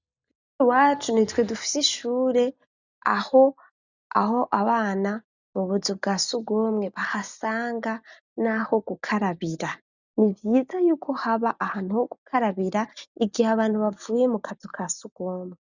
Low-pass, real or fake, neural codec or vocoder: 7.2 kHz; real; none